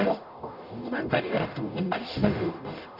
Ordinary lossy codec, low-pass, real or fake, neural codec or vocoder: none; 5.4 kHz; fake; codec, 44.1 kHz, 0.9 kbps, DAC